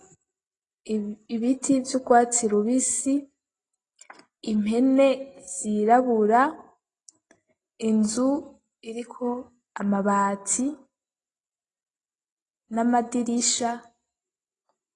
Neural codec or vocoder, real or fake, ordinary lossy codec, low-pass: none; real; AAC, 32 kbps; 10.8 kHz